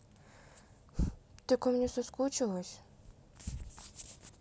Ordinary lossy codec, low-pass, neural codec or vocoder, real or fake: none; none; none; real